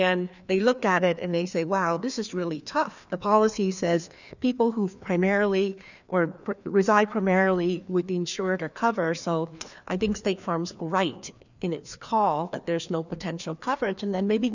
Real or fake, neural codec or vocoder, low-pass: fake; codec, 16 kHz, 2 kbps, FreqCodec, larger model; 7.2 kHz